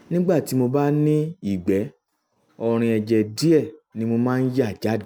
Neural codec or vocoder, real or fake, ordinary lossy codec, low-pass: none; real; none; none